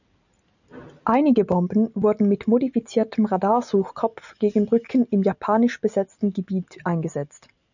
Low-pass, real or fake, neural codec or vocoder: 7.2 kHz; real; none